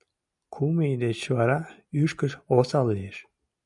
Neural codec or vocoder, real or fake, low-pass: none; real; 10.8 kHz